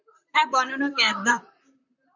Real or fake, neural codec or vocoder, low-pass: fake; vocoder, 44.1 kHz, 128 mel bands, Pupu-Vocoder; 7.2 kHz